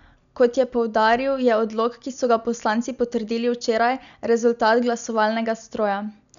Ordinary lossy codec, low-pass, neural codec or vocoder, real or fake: none; 7.2 kHz; none; real